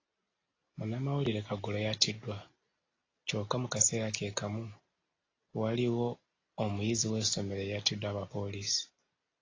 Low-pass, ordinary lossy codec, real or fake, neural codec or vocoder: 7.2 kHz; AAC, 32 kbps; real; none